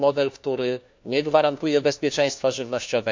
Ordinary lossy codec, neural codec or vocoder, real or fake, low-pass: MP3, 64 kbps; codec, 16 kHz, 1 kbps, FunCodec, trained on LibriTTS, 50 frames a second; fake; 7.2 kHz